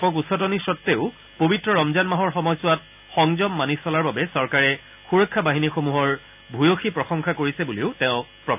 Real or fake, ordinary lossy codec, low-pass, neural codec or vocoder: real; none; 3.6 kHz; none